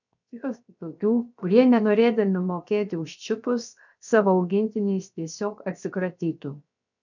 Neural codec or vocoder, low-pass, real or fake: codec, 16 kHz, 0.7 kbps, FocalCodec; 7.2 kHz; fake